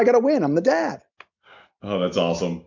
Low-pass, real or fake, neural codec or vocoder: 7.2 kHz; real; none